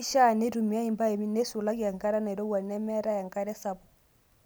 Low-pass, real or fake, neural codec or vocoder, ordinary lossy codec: none; real; none; none